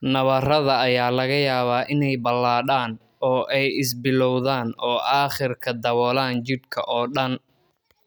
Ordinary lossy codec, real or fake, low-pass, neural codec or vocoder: none; real; none; none